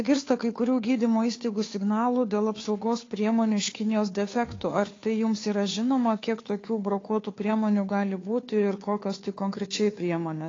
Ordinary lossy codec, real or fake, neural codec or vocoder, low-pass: AAC, 32 kbps; fake; codec, 16 kHz, 2 kbps, FunCodec, trained on Chinese and English, 25 frames a second; 7.2 kHz